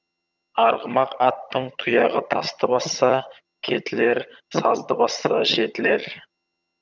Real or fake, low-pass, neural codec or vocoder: fake; 7.2 kHz; vocoder, 22.05 kHz, 80 mel bands, HiFi-GAN